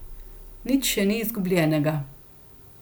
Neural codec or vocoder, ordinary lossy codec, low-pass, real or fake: none; none; none; real